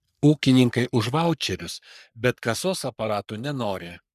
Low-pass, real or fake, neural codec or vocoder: 14.4 kHz; fake; codec, 44.1 kHz, 3.4 kbps, Pupu-Codec